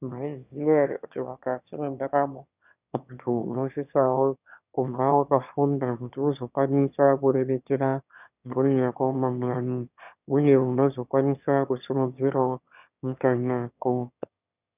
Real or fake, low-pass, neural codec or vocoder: fake; 3.6 kHz; autoencoder, 22.05 kHz, a latent of 192 numbers a frame, VITS, trained on one speaker